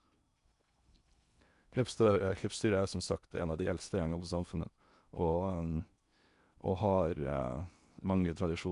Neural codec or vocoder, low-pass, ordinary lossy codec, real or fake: codec, 16 kHz in and 24 kHz out, 0.8 kbps, FocalCodec, streaming, 65536 codes; 10.8 kHz; none; fake